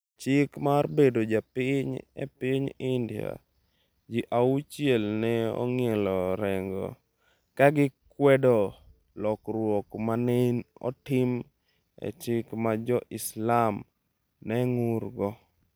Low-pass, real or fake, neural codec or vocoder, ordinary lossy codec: none; real; none; none